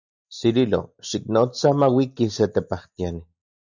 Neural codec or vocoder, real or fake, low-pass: none; real; 7.2 kHz